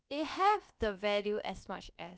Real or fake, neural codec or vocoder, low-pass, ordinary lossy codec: fake; codec, 16 kHz, about 1 kbps, DyCAST, with the encoder's durations; none; none